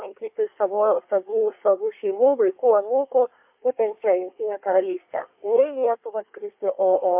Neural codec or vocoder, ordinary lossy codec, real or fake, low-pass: codec, 24 kHz, 1 kbps, SNAC; MP3, 32 kbps; fake; 3.6 kHz